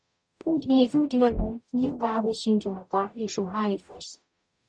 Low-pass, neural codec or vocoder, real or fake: 9.9 kHz; codec, 44.1 kHz, 0.9 kbps, DAC; fake